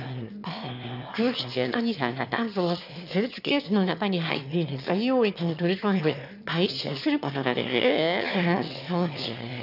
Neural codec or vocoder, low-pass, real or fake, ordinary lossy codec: autoencoder, 22.05 kHz, a latent of 192 numbers a frame, VITS, trained on one speaker; 5.4 kHz; fake; none